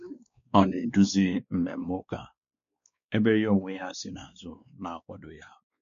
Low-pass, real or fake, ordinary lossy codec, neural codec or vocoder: 7.2 kHz; fake; MP3, 64 kbps; codec, 16 kHz, 2 kbps, X-Codec, WavLM features, trained on Multilingual LibriSpeech